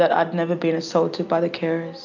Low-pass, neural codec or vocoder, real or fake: 7.2 kHz; none; real